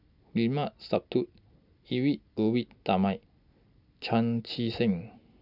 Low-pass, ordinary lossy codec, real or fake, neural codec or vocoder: 5.4 kHz; none; real; none